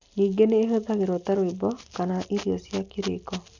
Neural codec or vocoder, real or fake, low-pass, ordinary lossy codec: none; real; 7.2 kHz; none